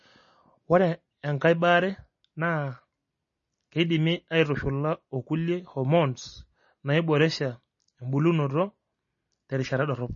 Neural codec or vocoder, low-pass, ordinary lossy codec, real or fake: none; 7.2 kHz; MP3, 32 kbps; real